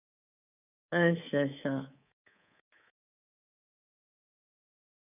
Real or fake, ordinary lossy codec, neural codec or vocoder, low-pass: fake; none; codec, 24 kHz, 3.1 kbps, DualCodec; 3.6 kHz